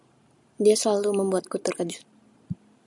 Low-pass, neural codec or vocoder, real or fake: 10.8 kHz; none; real